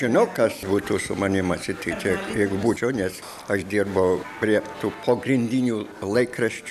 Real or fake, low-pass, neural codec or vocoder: real; 14.4 kHz; none